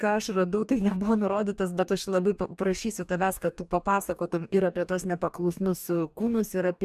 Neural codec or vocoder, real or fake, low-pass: codec, 44.1 kHz, 2.6 kbps, DAC; fake; 14.4 kHz